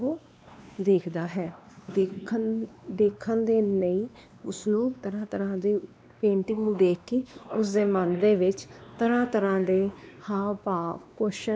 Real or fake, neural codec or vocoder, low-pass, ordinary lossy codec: fake; codec, 16 kHz, 2 kbps, X-Codec, WavLM features, trained on Multilingual LibriSpeech; none; none